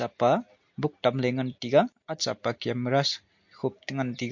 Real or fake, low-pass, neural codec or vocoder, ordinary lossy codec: real; 7.2 kHz; none; MP3, 48 kbps